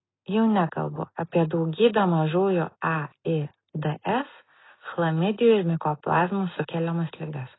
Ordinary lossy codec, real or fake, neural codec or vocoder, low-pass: AAC, 16 kbps; real; none; 7.2 kHz